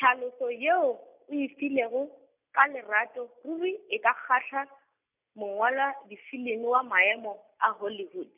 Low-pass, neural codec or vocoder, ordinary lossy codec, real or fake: 3.6 kHz; none; none; real